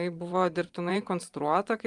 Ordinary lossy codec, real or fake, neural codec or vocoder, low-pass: Opus, 32 kbps; fake; vocoder, 44.1 kHz, 128 mel bands every 512 samples, BigVGAN v2; 10.8 kHz